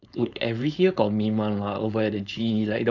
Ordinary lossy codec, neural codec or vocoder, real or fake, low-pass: none; codec, 16 kHz, 4.8 kbps, FACodec; fake; 7.2 kHz